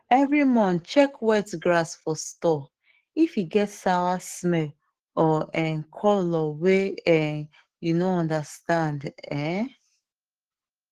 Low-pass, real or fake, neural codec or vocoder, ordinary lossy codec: 14.4 kHz; fake; codec, 44.1 kHz, 7.8 kbps, DAC; Opus, 16 kbps